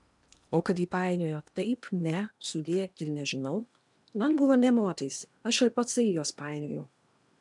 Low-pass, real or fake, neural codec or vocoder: 10.8 kHz; fake; codec, 16 kHz in and 24 kHz out, 0.8 kbps, FocalCodec, streaming, 65536 codes